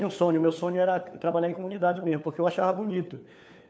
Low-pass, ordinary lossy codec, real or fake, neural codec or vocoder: none; none; fake; codec, 16 kHz, 4 kbps, FunCodec, trained on LibriTTS, 50 frames a second